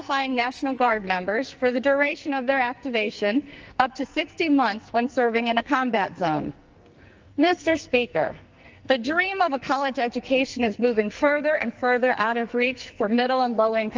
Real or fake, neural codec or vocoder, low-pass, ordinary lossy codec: fake; codec, 44.1 kHz, 2.6 kbps, SNAC; 7.2 kHz; Opus, 24 kbps